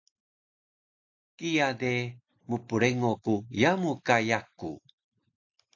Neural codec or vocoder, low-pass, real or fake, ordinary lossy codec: none; 7.2 kHz; real; AAC, 48 kbps